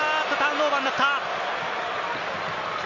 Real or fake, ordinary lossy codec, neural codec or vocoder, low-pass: real; none; none; 7.2 kHz